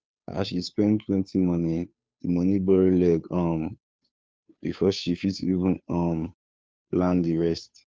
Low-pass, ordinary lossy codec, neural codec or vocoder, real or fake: none; none; codec, 16 kHz, 2 kbps, FunCodec, trained on Chinese and English, 25 frames a second; fake